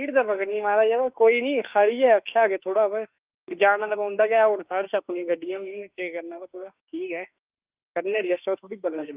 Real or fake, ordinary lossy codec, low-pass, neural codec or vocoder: fake; Opus, 24 kbps; 3.6 kHz; autoencoder, 48 kHz, 32 numbers a frame, DAC-VAE, trained on Japanese speech